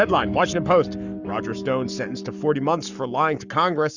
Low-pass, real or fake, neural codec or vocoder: 7.2 kHz; fake; codec, 44.1 kHz, 7.8 kbps, Pupu-Codec